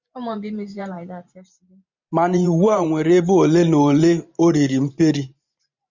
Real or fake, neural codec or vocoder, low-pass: fake; vocoder, 44.1 kHz, 128 mel bands every 512 samples, BigVGAN v2; 7.2 kHz